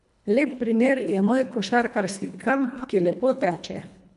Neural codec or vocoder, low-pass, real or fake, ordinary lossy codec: codec, 24 kHz, 1.5 kbps, HILCodec; 10.8 kHz; fake; none